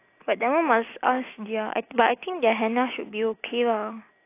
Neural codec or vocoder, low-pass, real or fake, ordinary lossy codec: none; 3.6 kHz; real; AAC, 24 kbps